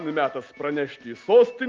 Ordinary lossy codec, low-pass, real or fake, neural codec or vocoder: Opus, 32 kbps; 7.2 kHz; real; none